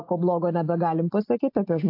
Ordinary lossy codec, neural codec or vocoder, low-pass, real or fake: AAC, 32 kbps; none; 5.4 kHz; real